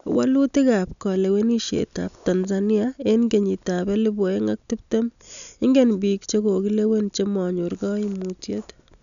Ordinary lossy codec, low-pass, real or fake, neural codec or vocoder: none; 7.2 kHz; real; none